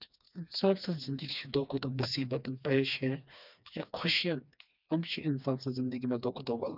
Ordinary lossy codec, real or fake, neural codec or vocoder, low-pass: none; fake; codec, 16 kHz, 2 kbps, FreqCodec, smaller model; 5.4 kHz